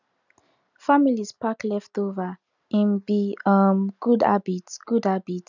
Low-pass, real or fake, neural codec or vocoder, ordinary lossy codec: 7.2 kHz; real; none; none